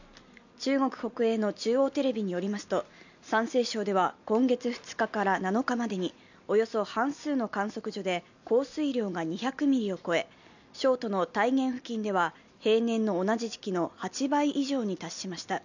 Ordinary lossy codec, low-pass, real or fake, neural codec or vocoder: none; 7.2 kHz; real; none